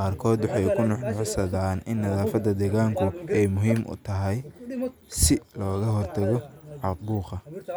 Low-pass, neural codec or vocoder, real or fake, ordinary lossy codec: none; none; real; none